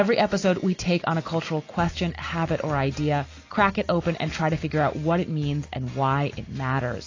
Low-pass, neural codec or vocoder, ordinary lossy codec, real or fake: 7.2 kHz; none; AAC, 32 kbps; real